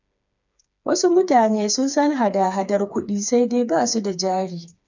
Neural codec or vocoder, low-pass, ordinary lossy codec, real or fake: codec, 16 kHz, 4 kbps, FreqCodec, smaller model; 7.2 kHz; none; fake